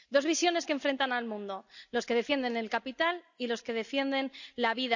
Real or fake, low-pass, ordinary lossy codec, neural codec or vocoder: real; 7.2 kHz; none; none